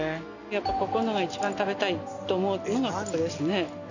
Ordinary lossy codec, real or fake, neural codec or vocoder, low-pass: none; real; none; 7.2 kHz